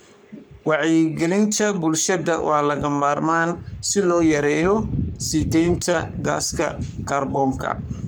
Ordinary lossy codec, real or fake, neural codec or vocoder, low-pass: none; fake; codec, 44.1 kHz, 3.4 kbps, Pupu-Codec; none